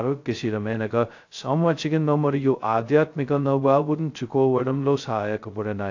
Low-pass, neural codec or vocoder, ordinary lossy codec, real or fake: 7.2 kHz; codec, 16 kHz, 0.2 kbps, FocalCodec; AAC, 48 kbps; fake